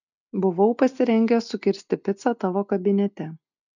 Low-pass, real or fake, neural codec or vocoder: 7.2 kHz; real; none